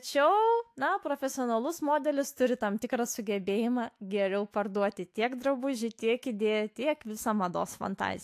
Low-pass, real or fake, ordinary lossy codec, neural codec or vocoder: 14.4 kHz; fake; AAC, 64 kbps; autoencoder, 48 kHz, 128 numbers a frame, DAC-VAE, trained on Japanese speech